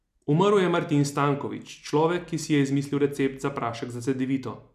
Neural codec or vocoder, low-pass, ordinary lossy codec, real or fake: none; 14.4 kHz; none; real